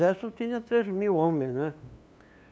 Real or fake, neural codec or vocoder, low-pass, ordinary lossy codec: fake; codec, 16 kHz, 2 kbps, FunCodec, trained on LibriTTS, 25 frames a second; none; none